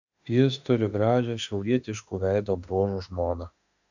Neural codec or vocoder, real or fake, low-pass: autoencoder, 48 kHz, 32 numbers a frame, DAC-VAE, trained on Japanese speech; fake; 7.2 kHz